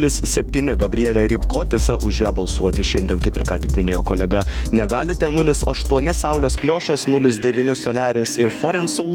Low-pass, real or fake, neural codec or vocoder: 19.8 kHz; fake; codec, 44.1 kHz, 2.6 kbps, DAC